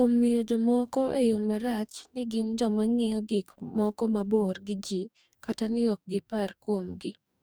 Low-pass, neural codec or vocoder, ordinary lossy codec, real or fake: none; codec, 44.1 kHz, 2.6 kbps, DAC; none; fake